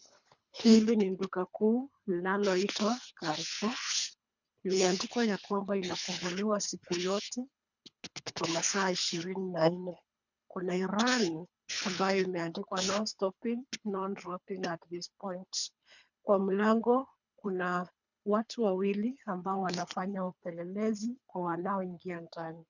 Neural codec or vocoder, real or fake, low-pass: codec, 24 kHz, 3 kbps, HILCodec; fake; 7.2 kHz